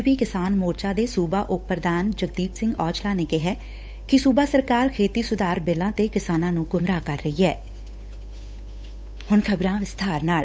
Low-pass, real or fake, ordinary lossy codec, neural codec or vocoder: none; fake; none; codec, 16 kHz, 8 kbps, FunCodec, trained on Chinese and English, 25 frames a second